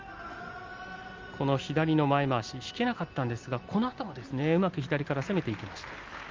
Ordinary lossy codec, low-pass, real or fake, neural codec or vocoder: Opus, 32 kbps; 7.2 kHz; real; none